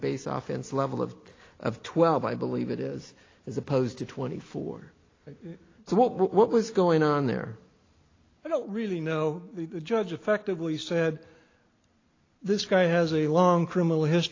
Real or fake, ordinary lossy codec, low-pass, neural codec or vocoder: real; AAC, 32 kbps; 7.2 kHz; none